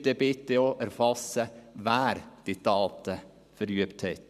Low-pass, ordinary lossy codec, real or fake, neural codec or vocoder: 14.4 kHz; none; fake; vocoder, 44.1 kHz, 128 mel bands every 512 samples, BigVGAN v2